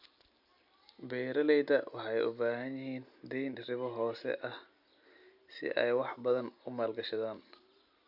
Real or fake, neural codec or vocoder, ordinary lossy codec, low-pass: real; none; none; 5.4 kHz